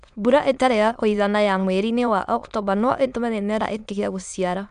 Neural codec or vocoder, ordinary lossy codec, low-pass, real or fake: autoencoder, 22.05 kHz, a latent of 192 numbers a frame, VITS, trained on many speakers; none; 9.9 kHz; fake